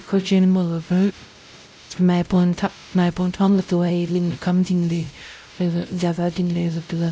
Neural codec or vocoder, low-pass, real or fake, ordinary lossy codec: codec, 16 kHz, 0.5 kbps, X-Codec, WavLM features, trained on Multilingual LibriSpeech; none; fake; none